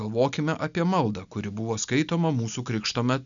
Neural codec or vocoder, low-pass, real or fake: none; 7.2 kHz; real